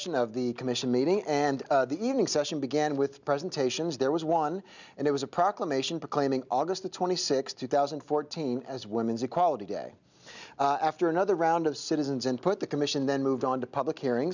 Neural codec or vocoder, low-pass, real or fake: none; 7.2 kHz; real